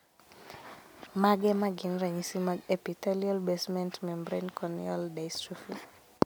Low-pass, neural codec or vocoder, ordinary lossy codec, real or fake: none; none; none; real